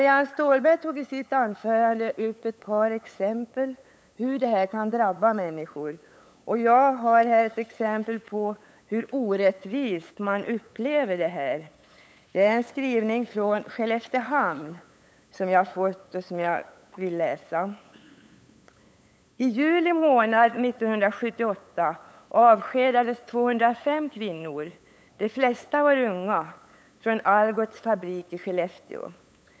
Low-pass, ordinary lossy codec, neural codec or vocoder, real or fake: none; none; codec, 16 kHz, 8 kbps, FunCodec, trained on LibriTTS, 25 frames a second; fake